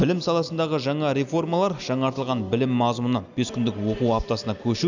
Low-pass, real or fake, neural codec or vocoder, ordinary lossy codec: 7.2 kHz; real; none; none